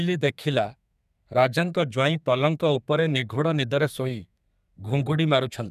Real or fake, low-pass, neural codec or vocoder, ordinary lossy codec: fake; 14.4 kHz; codec, 32 kHz, 1.9 kbps, SNAC; none